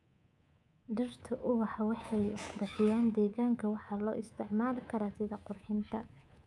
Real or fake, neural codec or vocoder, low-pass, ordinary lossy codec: fake; codec, 24 kHz, 3.1 kbps, DualCodec; none; none